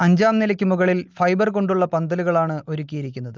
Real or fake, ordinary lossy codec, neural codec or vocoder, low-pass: real; Opus, 32 kbps; none; 7.2 kHz